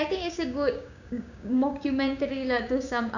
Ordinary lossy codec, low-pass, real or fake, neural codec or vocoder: none; 7.2 kHz; fake; codec, 16 kHz, 6 kbps, DAC